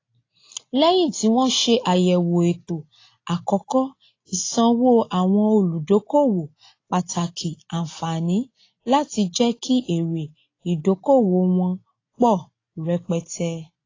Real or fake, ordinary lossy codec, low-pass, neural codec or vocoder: real; AAC, 32 kbps; 7.2 kHz; none